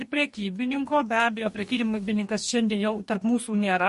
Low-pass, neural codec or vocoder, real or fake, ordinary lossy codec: 14.4 kHz; codec, 44.1 kHz, 2.6 kbps, DAC; fake; MP3, 48 kbps